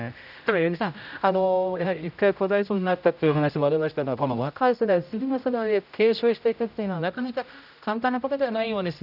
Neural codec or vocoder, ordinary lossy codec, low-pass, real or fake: codec, 16 kHz, 0.5 kbps, X-Codec, HuBERT features, trained on general audio; none; 5.4 kHz; fake